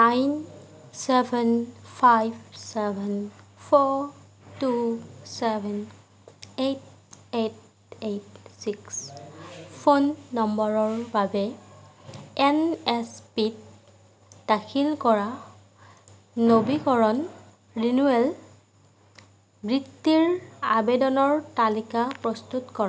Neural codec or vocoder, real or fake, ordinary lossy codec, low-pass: none; real; none; none